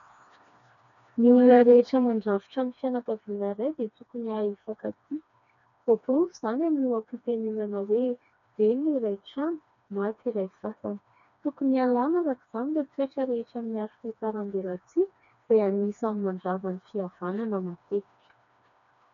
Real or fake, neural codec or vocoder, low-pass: fake; codec, 16 kHz, 2 kbps, FreqCodec, smaller model; 7.2 kHz